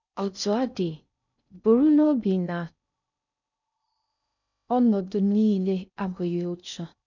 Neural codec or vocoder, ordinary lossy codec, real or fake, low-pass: codec, 16 kHz in and 24 kHz out, 0.6 kbps, FocalCodec, streaming, 4096 codes; none; fake; 7.2 kHz